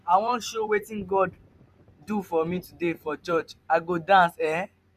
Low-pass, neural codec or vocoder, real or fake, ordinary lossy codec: 14.4 kHz; vocoder, 44.1 kHz, 128 mel bands every 256 samples, BigVGAN v2; fake; none